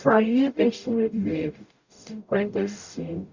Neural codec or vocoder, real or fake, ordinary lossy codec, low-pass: codec, 44.1 kHz, 0.9 kbps, DAC; fake; Opus, 64 kbps; 7.2 kHz